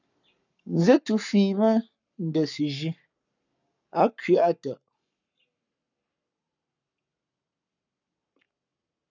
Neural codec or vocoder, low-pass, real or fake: codec, 44.1 kHz, 7.8 kbps, Pupu-Codec; 7.2 kHz; fake